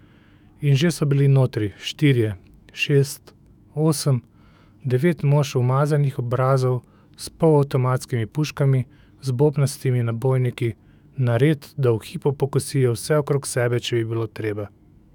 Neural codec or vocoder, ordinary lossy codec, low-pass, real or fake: autoencoder, 48 kHz, 128 numbers a frame, DAC-VAE, trained on Japanese speech; none; 19.8 kHz; fake